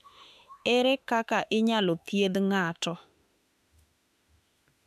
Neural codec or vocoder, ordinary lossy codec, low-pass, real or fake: autoencoder, 48 kHz, 32 numbers a frame, DAC-VAE, trained on Japanese speech; none; 14.4 kHz; fake